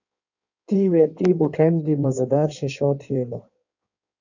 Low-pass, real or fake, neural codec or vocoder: 7.2 kHz; fake; codec, 16 kHz in and 24 kHz out, 1.1 kbps, FireRedTTS-2 codec